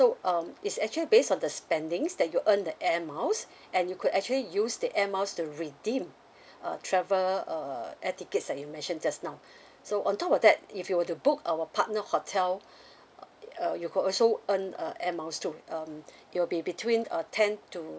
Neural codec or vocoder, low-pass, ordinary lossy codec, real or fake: none; none; none; real